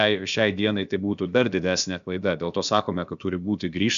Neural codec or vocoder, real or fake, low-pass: codec, 16 kHz, about 1 kbps, DyCAST, with the encoder's durations; fake; 7.2 kHz